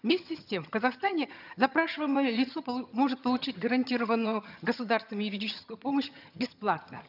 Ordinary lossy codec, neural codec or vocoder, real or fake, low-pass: none; vocoder, 22.05 kHz, 80 mel bands, HiFi-GAN; fake; 5.4 kHz